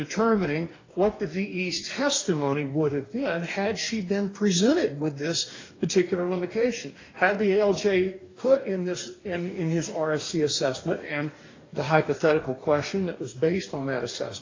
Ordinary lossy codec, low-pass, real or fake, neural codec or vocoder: AAC, 32 kbps; 7.2 kHz; fake; codec, 44.1 kHz, 2.6 kbps, DAC